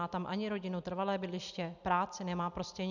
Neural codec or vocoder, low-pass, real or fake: none; 7.2 kHz; real